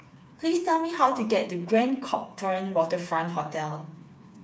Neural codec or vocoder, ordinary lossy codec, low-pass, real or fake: codec, 16 kHz, 4 kbps, FreqCodec, smaller model; none; none; fake